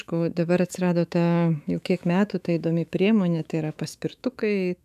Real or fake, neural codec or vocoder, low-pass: fake; autoencoder, 48 kHz, 128 numbers a frame, DAC-VAE, trained on Japanese speech; 14.4 kHz